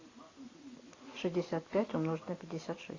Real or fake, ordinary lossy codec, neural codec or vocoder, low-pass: real; AAC, 32 kbps; none; 7.2 kHz